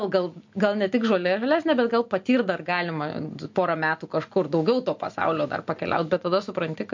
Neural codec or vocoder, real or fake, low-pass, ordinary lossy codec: none; real; 7.2 kHz; MP3, 48 kbps